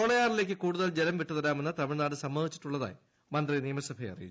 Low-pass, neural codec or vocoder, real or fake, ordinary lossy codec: none; none; real; none